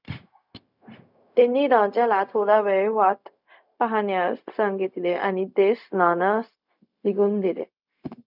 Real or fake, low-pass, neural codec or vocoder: fake; 5.4 kHz; codec, 16 kHz, 0.4 kbps, LongCat-Audio-Codec